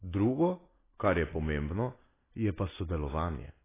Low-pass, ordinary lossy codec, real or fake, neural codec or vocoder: 3.6 kHz; AAC, 16 kbps; real; none